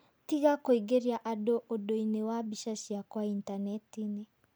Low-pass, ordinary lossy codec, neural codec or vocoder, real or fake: none; none; none; real